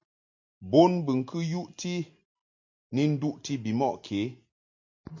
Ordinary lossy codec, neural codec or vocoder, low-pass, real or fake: MP3, 48 kbps; none; 7.2 kHz; real